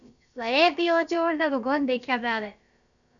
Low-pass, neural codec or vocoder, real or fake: 7.2 kHz; codec, 16 kHz, about 1 kbps, DyCAST, with the encoder's durations; fake